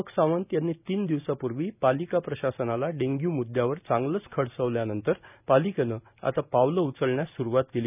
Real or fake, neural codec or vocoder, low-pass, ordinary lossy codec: real; none; 3.6 kHz; none